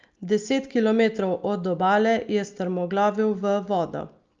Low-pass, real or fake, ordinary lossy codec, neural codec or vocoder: 7.2 kHz; real; Opus, 24 kbps; none